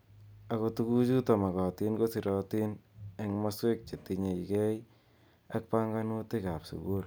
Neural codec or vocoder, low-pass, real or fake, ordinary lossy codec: none; none; real; none